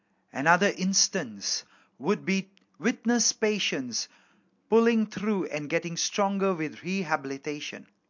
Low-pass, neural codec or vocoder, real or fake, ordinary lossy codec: 7.2 kHz; none; real; MP3, 48 kbps